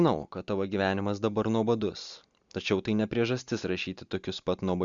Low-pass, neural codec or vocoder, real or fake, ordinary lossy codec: 7.2 kHz; none; real; Opus, 64 kbps